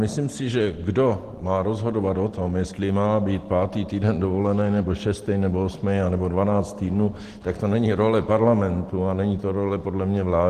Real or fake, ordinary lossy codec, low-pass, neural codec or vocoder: real; Opus, 16 kbps; 14.4 kHz; none